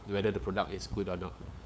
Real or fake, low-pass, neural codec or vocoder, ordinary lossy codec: fake; none; codec, 16 kHz, 8 kbps, FunCodec, trained on LibriTTS, 25 frames a second; none